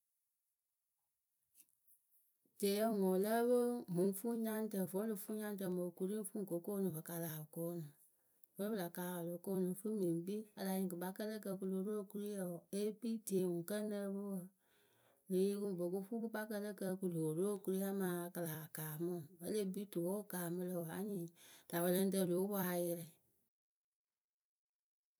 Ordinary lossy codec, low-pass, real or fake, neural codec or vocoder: none; none; fake; vocoder, 44.1 kHz, 128 mel bands every 256 samples, BigVGAN v2